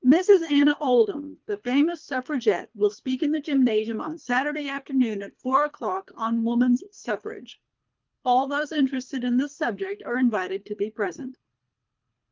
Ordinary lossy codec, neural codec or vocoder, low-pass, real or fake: Opus, 24 kbps; codec, 24 kHz, 3 kbps, HILCodec; 7.2 kHz; fake